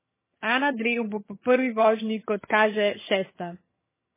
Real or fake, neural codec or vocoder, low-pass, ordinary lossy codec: fake; vocoder, 22.05 kHz, 80 mel bands, HiFi-GAN; 3.6 kHz; MP3, 16 kbps